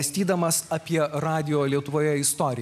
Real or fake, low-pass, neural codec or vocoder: real; 14.4 kHz; none